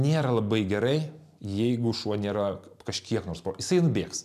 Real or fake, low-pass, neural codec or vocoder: real; 14.4 kHz; none